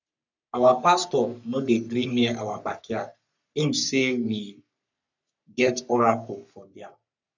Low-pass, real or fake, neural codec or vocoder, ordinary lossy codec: 7.2 kHz; fake; codec, 44.1 kHz, 3.4 kbps, Pupu-Codec; none